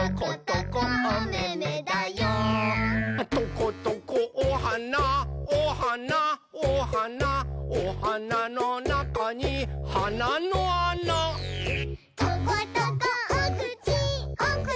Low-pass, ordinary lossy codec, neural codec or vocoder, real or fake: none; none; none; real